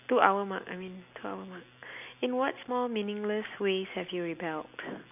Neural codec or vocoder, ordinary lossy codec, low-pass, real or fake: none; none; 3.6 kHz; real